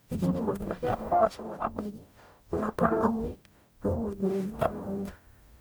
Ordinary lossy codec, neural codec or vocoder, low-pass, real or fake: none; codec, 44.1 kHz, 0.9 kbps, DAC; none; fake